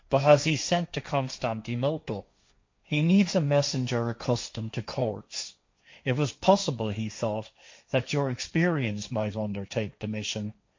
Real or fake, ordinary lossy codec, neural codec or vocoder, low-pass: fake; MP3, 48 kbps; codec, 16 kHz, 1.1 kbps, Voila-Tokenizer; 7.2 kHz